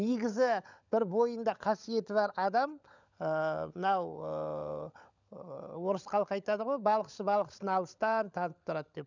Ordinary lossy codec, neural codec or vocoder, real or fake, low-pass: none; codec, 16 kHz, 16 kbps, FunCodec, trained on LibriTTS, 50 frames a second; fake; 7.2 kHz